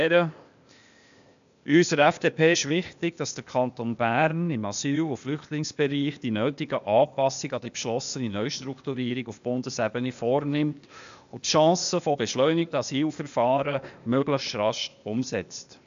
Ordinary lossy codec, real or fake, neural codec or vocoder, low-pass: AAC, 96 kbps; fake; codec, 16 kHz, 0.8 kbps, ZipCodec; 7.2 kHz